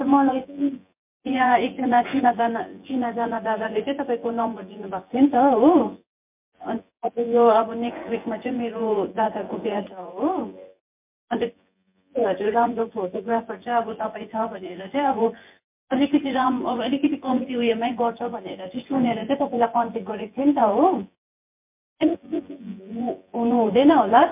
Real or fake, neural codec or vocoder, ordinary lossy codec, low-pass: fake; vocoder, 24 kHz, 100 mel bands, Vocos; MP3, 32 kbps; 3.6 kHz